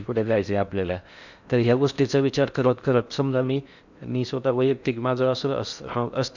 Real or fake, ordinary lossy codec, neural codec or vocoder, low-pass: fake; none; codec, 16 kHz in and 24 kHz out, 0.6 kbps, FocalCodec, streaming, 4096 codes; 7.2 kHz